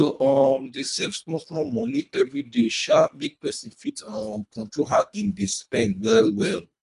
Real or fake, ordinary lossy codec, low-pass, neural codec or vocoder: fake; none; 10.8 kHz; codec, 24 kHz, 1.5 kbps, HILCodec